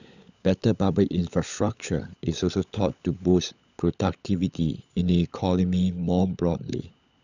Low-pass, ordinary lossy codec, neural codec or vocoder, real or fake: 7.2 kHz; none; codec, 16 kHz, 16 kbps, FunCodec, trained on LibriTTS, 50 frames a second; fake